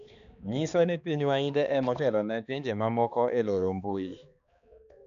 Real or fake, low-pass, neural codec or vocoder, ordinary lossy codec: fake; 7.2 kHz; codec, 16 kHz, 2 kbps, X-Codec, HuBERT features, trained on balanced general audio; none